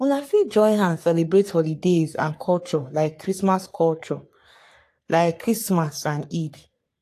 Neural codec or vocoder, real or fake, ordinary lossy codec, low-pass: codec, 44.1 kHz, 3.4 kbps, Pupu-Codec; fake; AAC, 64 kbps; 14.4 kHz